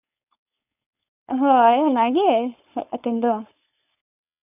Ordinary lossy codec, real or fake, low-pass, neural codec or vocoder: none; fake; 3.6 kHz; codec, 16 kHz, 4.8 kbps, FACodec